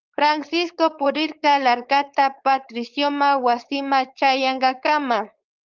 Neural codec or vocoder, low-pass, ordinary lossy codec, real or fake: codec, 16 kHz, 4.8 kbps, FACodec; 7.2 kHz; Opus, 32 kbps; fake